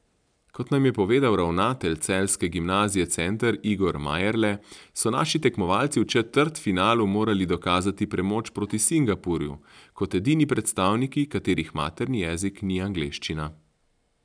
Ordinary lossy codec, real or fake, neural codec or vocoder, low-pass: none; real; none; 9.9 kHz